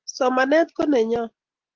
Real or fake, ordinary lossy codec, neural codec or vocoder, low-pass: real; Opus, 16 kbps; none; 7.2 kHz